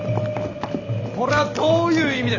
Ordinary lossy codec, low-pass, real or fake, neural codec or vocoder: none; 7.2 kHz; real; none